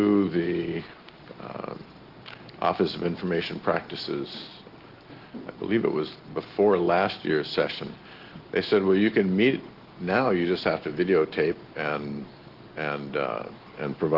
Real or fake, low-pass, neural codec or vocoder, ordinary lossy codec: real; 5.4 kHz; none; Opus, 16 kbps